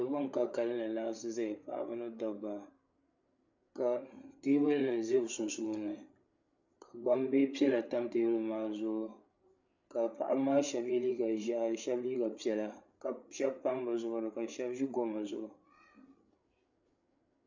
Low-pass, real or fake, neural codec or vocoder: 7.2 kHz; fake; codec, 16 kHz, 8 kbps, FreqCodec, larger model